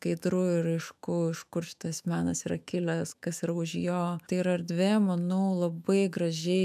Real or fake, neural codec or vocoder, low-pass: fake; autoencoder, 48 kHz, 128 numbers a frame, DAC-VAE, trained on Japanese speech; 14.4 kHz